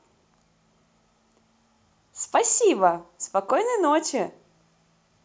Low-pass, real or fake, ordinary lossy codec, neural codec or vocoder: none; real; none; none